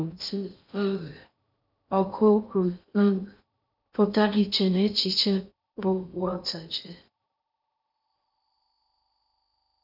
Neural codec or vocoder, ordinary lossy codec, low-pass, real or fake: codec, 16 kHz in and 24 kHz out, 0.6 kbps, FocalCodec, streaming, 4096 codes; none; 5.4 kHz; fake